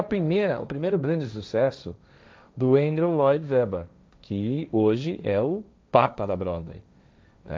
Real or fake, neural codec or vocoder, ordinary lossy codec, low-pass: fake; codec, 16 kHz, 1.1 kbps, Voila-Tokenizer; none; none